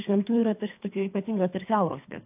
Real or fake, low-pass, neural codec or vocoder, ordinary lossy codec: fake; 3.6 kHz; codec, 24 kHz, 3 kbps, HILCodec; AAC, 32 kbps